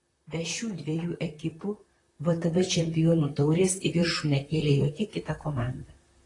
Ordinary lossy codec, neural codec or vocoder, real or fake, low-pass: AAC, 32 kbps; vocoder, 44.1 kHz, 128 mel bands, Pupu-Vocoder; fake; 10.8 kHz